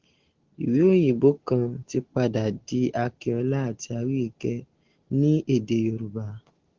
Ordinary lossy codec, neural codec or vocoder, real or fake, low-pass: Opus, 16 kbps; none; real; 7.2 kHz